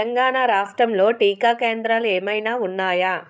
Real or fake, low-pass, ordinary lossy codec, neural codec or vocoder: fake; none; none; codec, 16 kHz, 16 kbps, FreqCodec, larger model